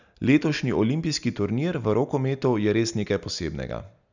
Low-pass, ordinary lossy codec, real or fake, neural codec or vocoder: 7.2 kHz; none; real; none